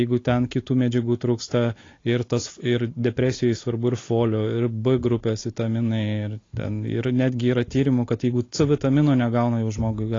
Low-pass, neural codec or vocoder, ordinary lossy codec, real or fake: 7.2 kHz; none; AAC, 32 kbps; real